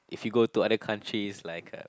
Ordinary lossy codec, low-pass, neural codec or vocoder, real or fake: none; none; none; real